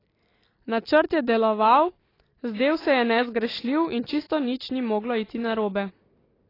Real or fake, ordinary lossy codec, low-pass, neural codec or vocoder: real; AAC, 24 kbps; 5.4 kHz; none